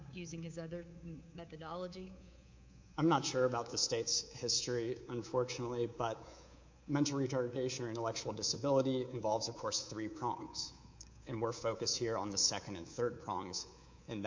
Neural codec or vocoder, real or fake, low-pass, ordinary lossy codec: codec, 24 kHz, 3.1 kbps, DualCodec; fake; 7.2 kHz; MP3, 48 kbps